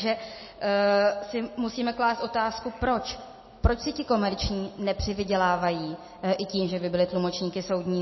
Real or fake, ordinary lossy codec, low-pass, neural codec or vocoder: real; MP3, 24 kbps; 7.2 kHz; none